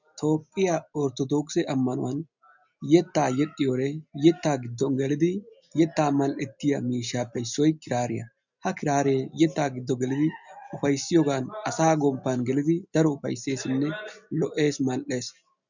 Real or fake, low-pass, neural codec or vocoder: real; 7.2 kHz; none